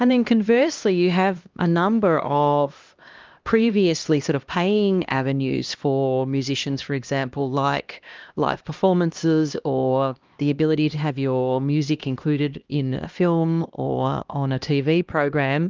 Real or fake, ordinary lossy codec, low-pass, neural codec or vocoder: fake; Opus, 24 kbps; 7.2 kHz; codec, 16 kHz, 1 kbps, X-Codec, HuBERT features, trained on LibriSpeech